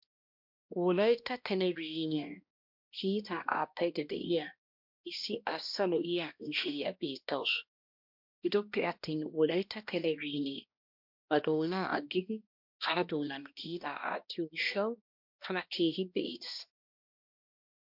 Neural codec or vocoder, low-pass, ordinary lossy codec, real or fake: codec, 16 kHz, 1 kbps, X-Codec, HuBERT features, trained on balanced general audio; 5.4 kHz; MP3, 48 kbps; fake